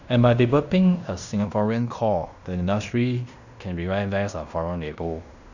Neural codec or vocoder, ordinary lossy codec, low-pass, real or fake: codec, 16 kHz in and 24 kHz out, 0.9 kbps, LongCat-Audio-Codec, fine tuned four codebook decoder; none; 7.2 kHz; fake